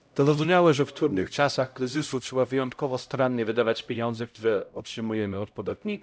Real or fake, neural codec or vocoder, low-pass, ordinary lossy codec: fake; codec, 16 kHz, 0.5 kbps, X-Codec, HuBERT features, trained on LibriSpeech; none; none